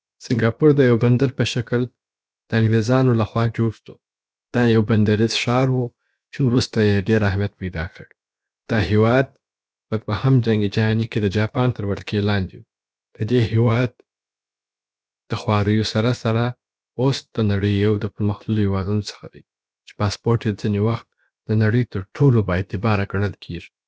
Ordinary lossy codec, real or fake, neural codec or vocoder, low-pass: none; fake; codec, 16 kHz, about 1 kbps, DyCAST, with the encoder's durations; none